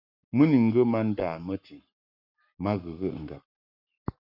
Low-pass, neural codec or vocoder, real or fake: 5.4 kHz; codec, 44.1 kHz, 7.8 kbps, Pupu-Codec; fake